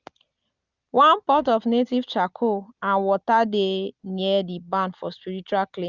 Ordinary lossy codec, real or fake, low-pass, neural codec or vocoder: Opus, 64 kbps; real; 7.2 kHz; none